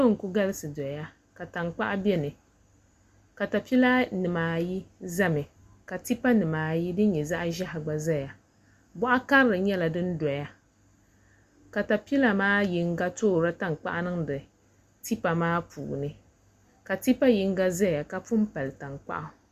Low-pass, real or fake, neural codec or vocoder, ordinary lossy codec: 14.4 kHz; real; none; Opus, 64 kbps